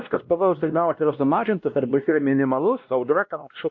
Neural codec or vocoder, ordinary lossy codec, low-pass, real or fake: codec, 16 kHz, 1 kbps, X-Codec, WavLM features, trained on Multilingual LibriSpeech; AAC, 48 kbps; 7.2 kHz; fake